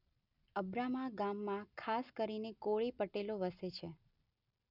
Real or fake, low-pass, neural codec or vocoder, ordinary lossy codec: real; 5.4 kHz; none; none